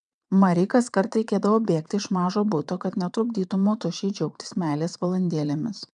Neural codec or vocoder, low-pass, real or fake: vocoder, 22.05 kHz, 80 mel bands, WaveNeXt; 9.9 kHz; fake